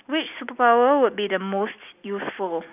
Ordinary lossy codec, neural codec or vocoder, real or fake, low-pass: none; none; real; 3.6 kHz